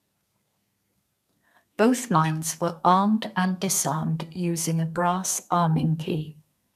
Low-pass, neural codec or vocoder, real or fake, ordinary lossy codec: 14.4 kHz; codec, 32 kHz, 1.9 kbps, SNAC; fake; none